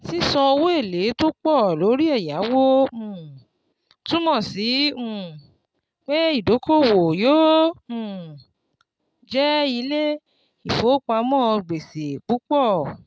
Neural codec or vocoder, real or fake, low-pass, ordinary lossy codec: none; real; none; none